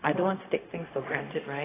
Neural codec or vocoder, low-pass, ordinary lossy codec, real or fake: codec, 16 kHz, 0.4 kbps, LongCat-Audio-Codec; 3.6 kHz; AAC, 16 kbps; fake